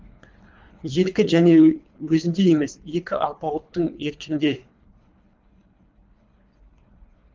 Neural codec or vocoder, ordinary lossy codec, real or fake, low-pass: codec, 24 kHz, 3 kbps, HILCodec; Opus, 32 kbps; fake; 7.2 kHz